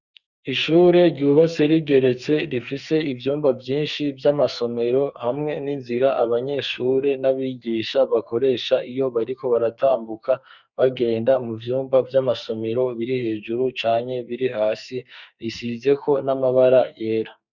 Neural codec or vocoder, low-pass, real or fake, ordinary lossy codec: codec, 44.1 kHz, 2.6 kbps, SNAC; 7.2 kHz; fake; Opus, 64 kbps